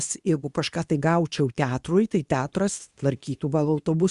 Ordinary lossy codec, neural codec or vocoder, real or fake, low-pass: Opus, 64 kbps; codec, 24 kHz, 0.9 kbps, WavTokenizer, medium speech release version 2; fake; 10.8 kHz